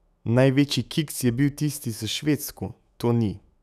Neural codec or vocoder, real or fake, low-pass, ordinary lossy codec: autoencoder, 48 kHz, 128 numbers a frame, DAC-VAE, trained on Japanese speech; fake; 14.4 kHz; none